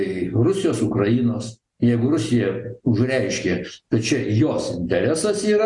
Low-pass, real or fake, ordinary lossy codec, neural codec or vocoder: 10.8 kHz; real; Opus, 64 kbps; none